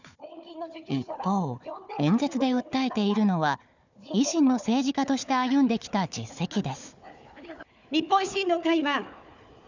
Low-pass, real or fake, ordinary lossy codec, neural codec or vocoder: 7.2 kHz; fake; none; codec, 16 kHz, 4 kbps, FunCodec, trained on Chinese and English, 50 frames a second